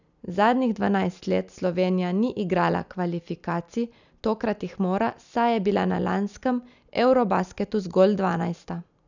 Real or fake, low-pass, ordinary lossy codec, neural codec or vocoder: real; 7.2 kHz; none; none